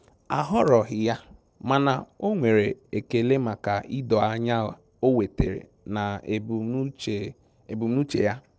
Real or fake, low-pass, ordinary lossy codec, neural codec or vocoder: real; none; none; none